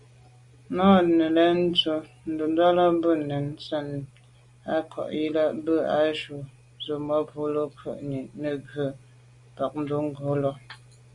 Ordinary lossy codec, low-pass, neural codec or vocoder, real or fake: Opus, 64 kbps; 10.8 kHz; none; real